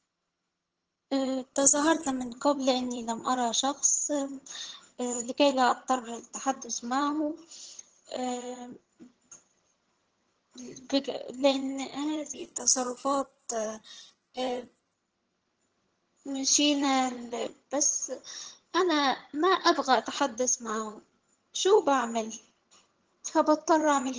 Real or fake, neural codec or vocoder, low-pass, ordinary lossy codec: fake; vocoder, 22.05 kHz, 80 mel bands, HiFi-GAN; 7.2 kHz; Opus, 16 kbps